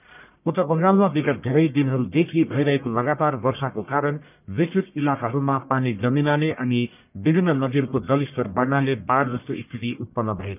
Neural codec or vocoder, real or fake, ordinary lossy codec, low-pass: codec, 44.1 kHz, 1.7 kbps, Pupu-Codec; fake; none; 3.6 kHz